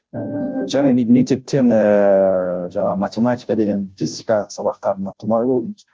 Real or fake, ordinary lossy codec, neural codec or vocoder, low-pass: fake; none; codec, 16 kHz, 0.5 kbps, FunCodec, trained on Chinese and English, 25 frames a second; none